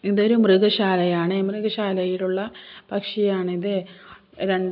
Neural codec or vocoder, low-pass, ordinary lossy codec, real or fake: none; 5.4 kHz; AAC, 48 kbps; real